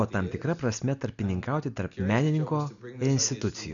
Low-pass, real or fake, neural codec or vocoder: 7.2 kHz; real; none